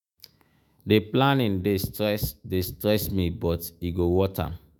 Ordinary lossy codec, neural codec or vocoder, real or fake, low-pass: none; autoencoder, 48 kHz, 128 numbers a frame, DAC-VAE, trained on Japanese speech; fake; none